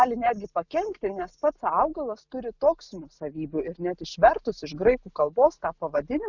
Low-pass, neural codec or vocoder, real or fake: 7.2 kHz; none; real